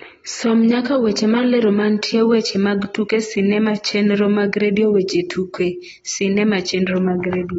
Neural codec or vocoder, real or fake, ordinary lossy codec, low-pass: none; real; AAC, 24 kbps; 19.8 kHz